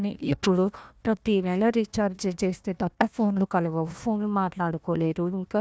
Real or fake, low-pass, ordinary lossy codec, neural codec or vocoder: fake; none; none; codec, 16 kHz, 1 kbps, FunCodec, trained on Chinese and English, 50 frames a second